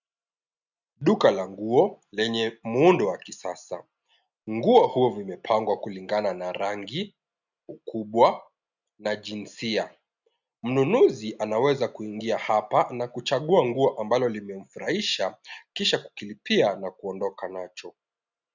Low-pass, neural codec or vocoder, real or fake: 7.2 kHz; none; real